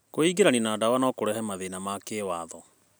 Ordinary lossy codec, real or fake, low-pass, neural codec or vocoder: none; real; none; none